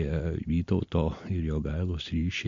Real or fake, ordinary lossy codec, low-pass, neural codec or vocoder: real; MP3, 48 kbps; 7.2 kHz; none